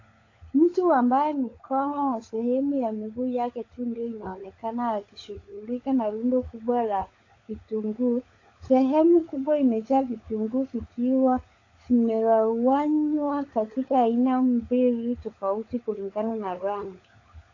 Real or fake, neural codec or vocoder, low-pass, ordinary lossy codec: fake; codec, 16 kHz, 16 kbps, FunCodec, trained on LibriTTS, 50 frames a second; 7.2 kHz; AAC, 32 kbps